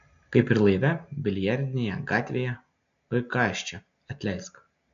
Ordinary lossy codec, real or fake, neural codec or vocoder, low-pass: AAC, 64 kbps; real; none; 7.2 kHz